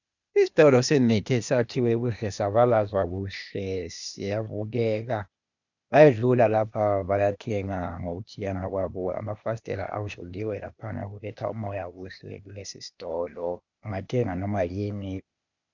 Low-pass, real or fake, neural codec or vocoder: 7.2 kHz; fake; codec, 16 kHz, 0.8 kbps, ZipCodec